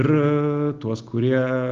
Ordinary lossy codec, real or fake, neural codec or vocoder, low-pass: Opus, 16 kbps; real; none; 7.2 kHz